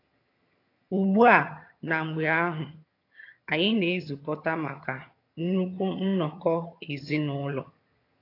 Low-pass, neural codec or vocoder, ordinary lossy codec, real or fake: 5.4 kHz; vocoder, 22.05 kHz, 80 mel bands, HiFi-GAN; AAC, 32 kbps; fake